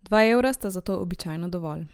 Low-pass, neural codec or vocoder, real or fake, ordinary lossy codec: 19.8 kHz; vocoder, 44.1 kHz, 128 mel bands every 256 samples, BigVGAN v2; fake; Opus, 32 kbps